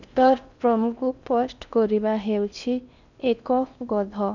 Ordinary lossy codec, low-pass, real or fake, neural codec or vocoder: none; 7.2 kHz; fake; codec, 16 kHz in and 24 kHz out, 0.6 kbps, FocalCodec, streaming, 4096 codes